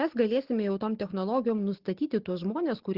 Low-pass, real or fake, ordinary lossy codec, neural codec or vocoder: 5.4 kHz; real; Opus, 16 kbps; none